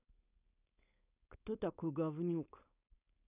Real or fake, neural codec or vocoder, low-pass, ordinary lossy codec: fake; codec, 16 kHz, 4.8 kbps, FACodec; 3.6 kHz; none